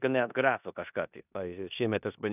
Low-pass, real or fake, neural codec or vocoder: 3.6 kHz; fake; codec, 16 kHz in and 24 kHz out, 0.9 kbps, LongCat-Audio-Codec, four codebook decoder